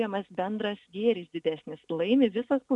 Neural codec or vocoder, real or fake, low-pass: none; real; 10.8 kHz